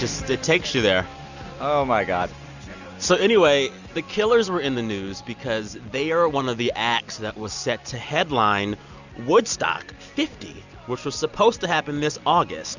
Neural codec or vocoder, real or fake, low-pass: none; real; 7.2 kHz